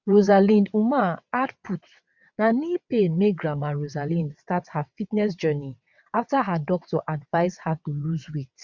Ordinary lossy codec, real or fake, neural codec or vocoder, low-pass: none; fake; vocoder, 22.05 kHz, 80 mel bands, WaveNeXt; 7.2 kHz